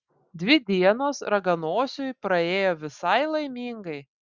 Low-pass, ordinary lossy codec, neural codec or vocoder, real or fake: 7.2 kHz; Opus, 64 kbps; none; real